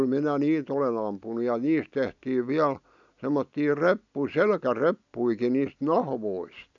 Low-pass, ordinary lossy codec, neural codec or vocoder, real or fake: 7.2 kHz; none; none; real